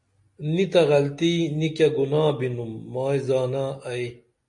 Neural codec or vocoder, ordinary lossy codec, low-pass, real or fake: none; MP3, 48 kbps; 10.8 kHz; real